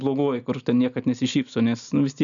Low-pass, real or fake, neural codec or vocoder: 7.2 kHz; real; none